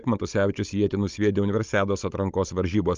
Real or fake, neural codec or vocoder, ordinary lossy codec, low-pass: fake; codec, 16 kHz, 16 kbps, FunCodec, trained on Chinese and English, 50 frames a second; Opus, 24 kbps; 7.2 kHz